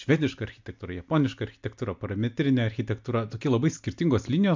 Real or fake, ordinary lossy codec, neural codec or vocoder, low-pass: real; MP3, 64 kbps; none; 7.2 kHz